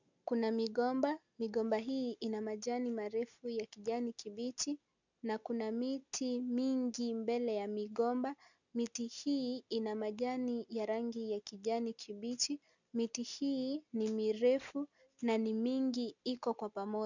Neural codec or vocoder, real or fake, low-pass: none; real; 7.2 kHz